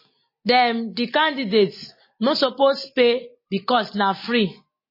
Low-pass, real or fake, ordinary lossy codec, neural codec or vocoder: 5.4 kHz; real; MP3, 24 kbps; none